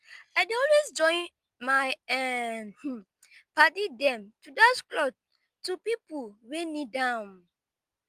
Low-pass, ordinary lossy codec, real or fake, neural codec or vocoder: 14.4 kHz; Opus, 32 kbps; real; none